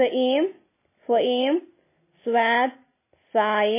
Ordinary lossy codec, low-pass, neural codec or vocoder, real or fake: MP3, 16 kbps; 3.6 kHz; none; real